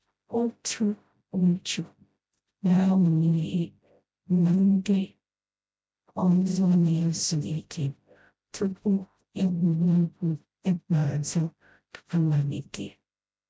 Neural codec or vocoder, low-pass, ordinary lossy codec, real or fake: codec, 16 kHz, 0.5 kbps, FreqCodec, smaller model; none; none; fake